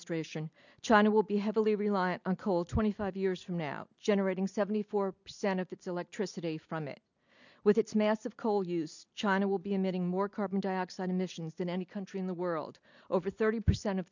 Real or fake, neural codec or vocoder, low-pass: real; none; 7.2 kHz